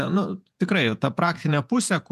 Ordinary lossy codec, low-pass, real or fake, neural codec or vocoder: MP3, 96 kbps; 14.4 kHz; fake; vocoder, 48 kHz, 128 mel bands, Vocos